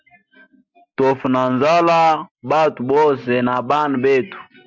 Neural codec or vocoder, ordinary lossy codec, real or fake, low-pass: none; MP3, 64 kbps; real; 7.2 kHz